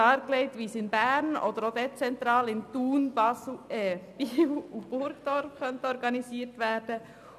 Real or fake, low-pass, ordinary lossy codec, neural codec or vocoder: real; 14.4 kHz; none; none